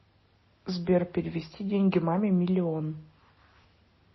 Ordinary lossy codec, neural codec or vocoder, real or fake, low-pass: MP3, 24 kbps; none; real; 7.2 kHz